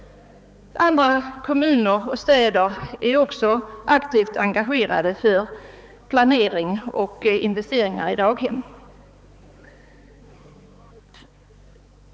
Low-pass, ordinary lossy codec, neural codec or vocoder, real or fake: none; none; codec, 16 kHz, 4 kbps, X-Codec, HuBERT features, trained on balanced general audio; fake